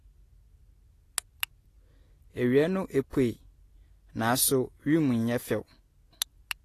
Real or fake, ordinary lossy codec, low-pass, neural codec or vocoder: real; AAC, 48 kbps; 14.4 kHz; none